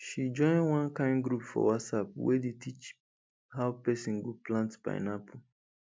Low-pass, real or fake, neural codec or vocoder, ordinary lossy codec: none; real; none; none